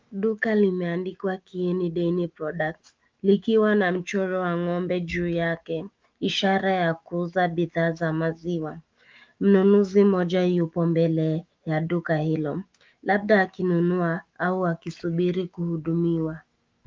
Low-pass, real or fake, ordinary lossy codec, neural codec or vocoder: 7.2 kHz; fake; Opus, 32 kbps; autoencoder, 48 kHz, 128 numbers a frame, DAC-VAE, trained on Japanese speech